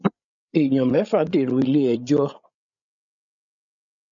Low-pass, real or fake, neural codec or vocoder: 7.2 kHz; fake; codec, 16 kHz, 16 kbps, FreqCodec, larger model